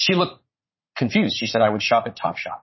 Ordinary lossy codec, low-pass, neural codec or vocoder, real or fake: MP3, 24 kbps; 7.2 kHz; vocoder, 44.1 kHz, 80 mel bands, Vocos; fake